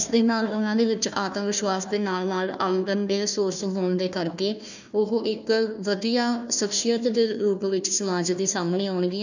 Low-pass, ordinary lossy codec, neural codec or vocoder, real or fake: 7.2 kHz; none; codec, 16 kHz, 1 kbps, FunCodec, trained on Chinese and English, 50 frames a second; fake